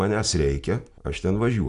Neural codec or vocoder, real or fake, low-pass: none; real; 10.8 kHz